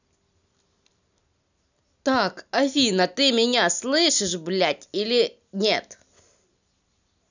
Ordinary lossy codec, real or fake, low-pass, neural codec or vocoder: none; real; 7.2 kHz; none